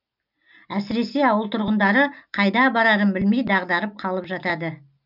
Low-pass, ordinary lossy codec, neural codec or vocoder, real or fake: 5.4 kHz; none; none; real